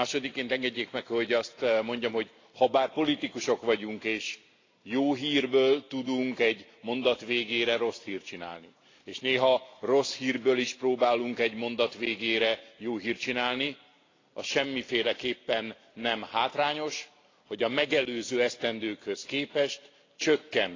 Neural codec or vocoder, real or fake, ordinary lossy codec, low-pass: none; real; AAC, 32 kbps; 7.2 kHz